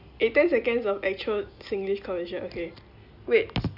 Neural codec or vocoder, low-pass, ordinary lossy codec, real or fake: none; 5.4 kHz; none; real